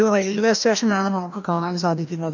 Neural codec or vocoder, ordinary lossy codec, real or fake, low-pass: codec, 16 kHz, 1 kbps, FreqCodec, larger model; none; fake; 7.2 kHz